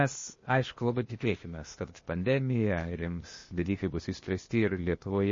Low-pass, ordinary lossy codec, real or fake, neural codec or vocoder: 7.2 kHz; MP3, 32 kbps; fake; codec, 16 kHz, 0.8 kbps, ZipCodec